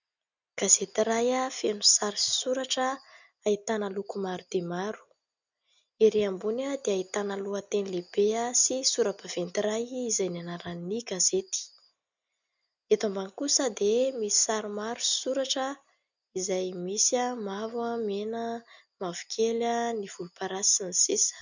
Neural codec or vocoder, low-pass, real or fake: none; 7.2 kHz; real